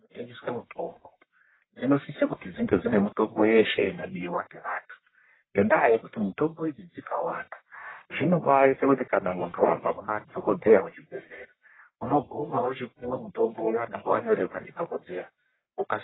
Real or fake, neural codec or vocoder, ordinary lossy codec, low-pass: fake; codec, 44.1 kHz, 1.7 kbps, Pupu-Codec; AAC, 16 kbps; 7.2 kHz